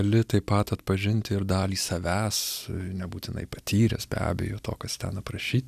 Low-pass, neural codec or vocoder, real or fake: 14.4 kHz; none; real